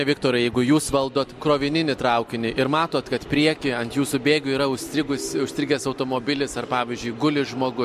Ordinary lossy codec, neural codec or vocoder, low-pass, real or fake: MP3, 64 kbps; none; 14.4 kHz; real